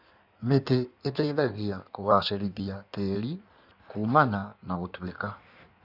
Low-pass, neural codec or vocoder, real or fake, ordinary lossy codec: 5.4 kHz; codec, 16 kHz in and 24 kHz out, 1.1 kbps, FireRedTTS-2 codec; fake; none